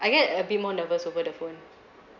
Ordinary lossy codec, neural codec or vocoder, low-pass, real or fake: none; none; 7.2 kHz; real